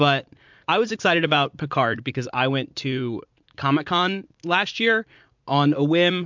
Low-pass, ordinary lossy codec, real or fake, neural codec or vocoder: 7.2 kHz; MP3, 64 kbps; fake; vocoder, 44.1 kHz, 80 mel bands, Vocos